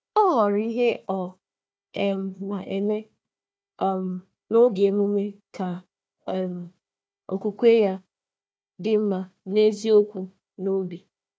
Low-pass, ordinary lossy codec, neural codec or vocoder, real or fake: none; none; codec, 16 kHz, 1 kbps, FunCodec, trained on Chinese and English, 50 frames a second; fake